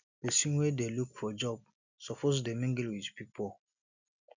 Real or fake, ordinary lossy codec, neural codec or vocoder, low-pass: real; none; none; 7.2 kHz